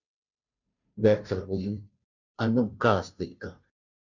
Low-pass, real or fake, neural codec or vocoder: 7.2 kHz; fake; codec, 16 kHz, 0.5 kbps, FunCodec, trained on Chinese and English, 25 frames a second